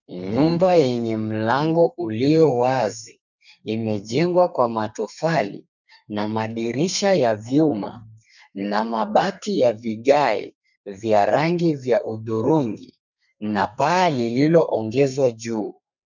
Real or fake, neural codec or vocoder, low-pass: fake; codec, 32 kHz, 1.9 kbps, SNAC; 7.2 kHz